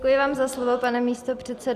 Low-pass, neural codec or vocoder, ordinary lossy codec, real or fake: 14.4 kHz; none; Opus, 64 kbps; real